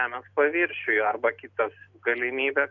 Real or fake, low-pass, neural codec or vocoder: real; 7.2 kHz; none